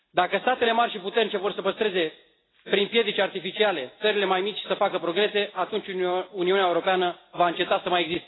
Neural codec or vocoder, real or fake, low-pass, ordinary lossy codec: none; real; 7.2 kHz; AAC, 16 kbps